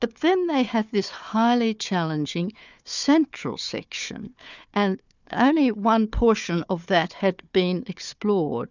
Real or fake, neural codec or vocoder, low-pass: fake; codec, 16 kHz, 4 kbps, FunCodec, trained on Chinese and English, 50 frames a second; 7.2 kHz